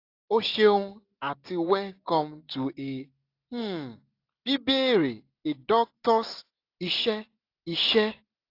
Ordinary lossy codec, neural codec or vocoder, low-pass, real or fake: AAC, 32 kbps; none; 5.4 kHz; real